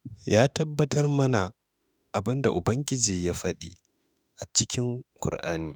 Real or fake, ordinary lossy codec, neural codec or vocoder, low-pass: fake; none; autoencoder, 48 kHz, 32 numbers a frame, DAC-VAE, trained on Japanese speech; none